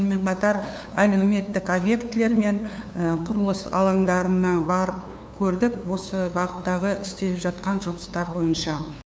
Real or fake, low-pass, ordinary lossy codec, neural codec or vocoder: fake; none; none; codec, 16 kHz, 2 kbps, FunCodec, trained on LibriTTS, 25 frames a second